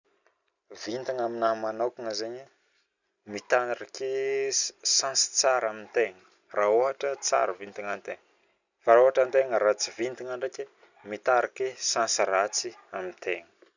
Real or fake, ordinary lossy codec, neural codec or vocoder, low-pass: real; none; none; 7.2 kHz